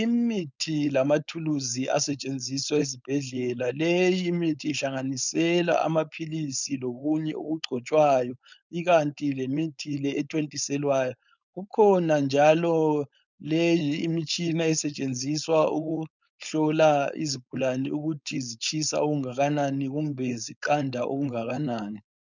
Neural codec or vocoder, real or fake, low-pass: codec, 16 kHz, 4.8 kbps, FACodec; fake; 7.2 kHz